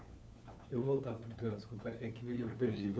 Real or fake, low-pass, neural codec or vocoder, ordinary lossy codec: fake; none; codec, 16 kHz, 4 kbps, FunCodec, trained on LibriTTS, 50 frames a second; none